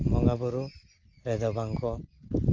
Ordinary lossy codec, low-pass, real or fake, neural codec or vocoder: Opus, 32 kbps; 7.2 kHz; real; none